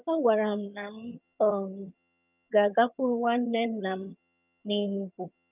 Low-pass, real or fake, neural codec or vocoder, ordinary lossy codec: 3.6 kHz; fake; vocoder, 22.05 kHz, 80 mel bands, HiFi-GAN; none